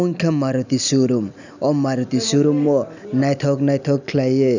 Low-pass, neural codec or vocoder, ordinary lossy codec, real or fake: 7.2 kHz; none; none; real